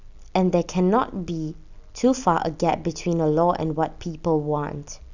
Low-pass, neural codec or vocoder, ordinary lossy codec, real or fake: 7.2 kHz; none; none; real